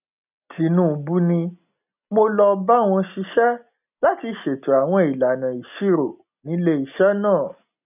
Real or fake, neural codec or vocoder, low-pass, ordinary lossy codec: real; none; 3.6 kHz; none